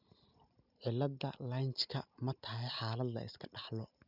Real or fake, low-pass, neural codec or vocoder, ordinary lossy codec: real; 5.4 kHz; none; none